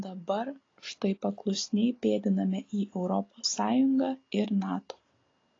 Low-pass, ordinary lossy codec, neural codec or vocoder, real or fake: 7.2 kHz; AAC, 32 kbps; none; real